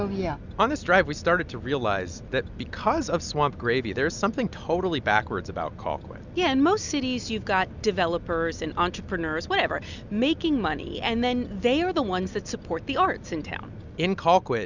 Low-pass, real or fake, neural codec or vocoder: 7.2 kHz; real; none